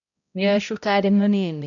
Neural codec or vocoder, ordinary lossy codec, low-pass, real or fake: codec, 16 kHz, 0.5 kbps, X-Codec, HuBERT features, trained on balanced general audio; none; 7.2 kHz; fake